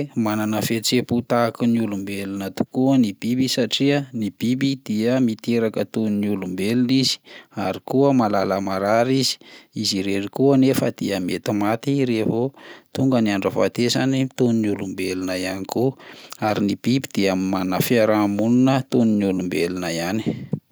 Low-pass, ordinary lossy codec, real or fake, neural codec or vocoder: none; none; real; none